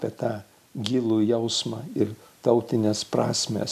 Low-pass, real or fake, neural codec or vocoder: 14.4 kHz; real; none